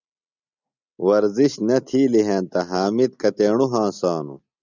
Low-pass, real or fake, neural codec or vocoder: 7.2 kHz; real; none